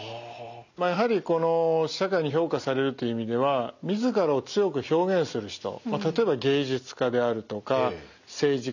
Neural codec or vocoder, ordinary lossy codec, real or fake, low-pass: none; none; real; 7.2 kHz